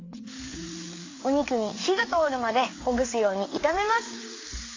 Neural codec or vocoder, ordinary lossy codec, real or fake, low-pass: codec, 16 kHz, 4 kbps, FreqCodec, larger model; AAC, 32 kbps; fake; 7.2 kHz